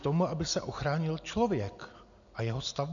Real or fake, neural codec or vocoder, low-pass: real; none; 7.2 kHz